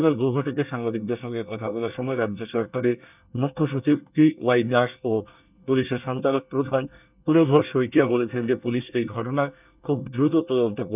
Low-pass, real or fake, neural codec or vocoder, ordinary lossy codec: 3.6 kHz; fake; codec, 24 kHz, 1 kbps, SNAC; none